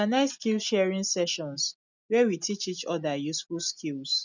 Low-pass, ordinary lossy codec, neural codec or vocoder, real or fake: 7.2 kHz; none; none; real